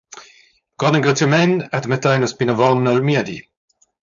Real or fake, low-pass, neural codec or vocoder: fake; 7.2 kHz; codec, 16 kHz, 4.8 kbps, FACodec